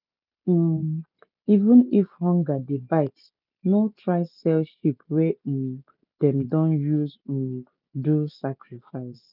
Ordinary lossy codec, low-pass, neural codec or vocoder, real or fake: none; 5.4 kHz; none; real